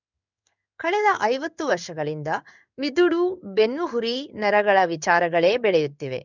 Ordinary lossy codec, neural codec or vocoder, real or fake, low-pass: none; codec, 16 kHz in and 24 kHz out, 1 kbps, XY-Tokenizer; fake; 7.2 kHz